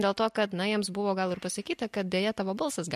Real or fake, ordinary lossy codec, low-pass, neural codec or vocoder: real; MP3, 64 kbps; 14.4 kHz; none